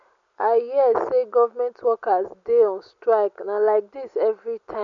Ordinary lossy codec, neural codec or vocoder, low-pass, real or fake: none; none; 7.2 kHz; real